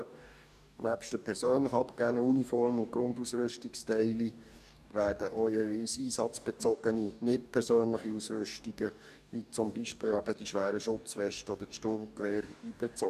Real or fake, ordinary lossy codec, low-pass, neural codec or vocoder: fake; none; 14.4 kHz; codec, 44.1 kHz, 2.6 kbps, DAC